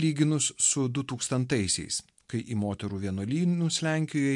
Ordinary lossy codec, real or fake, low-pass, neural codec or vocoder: MP3, 64 kbps; real; 10.8 kHz; none